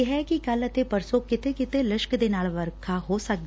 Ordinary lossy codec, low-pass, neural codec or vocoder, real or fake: none; none; none; real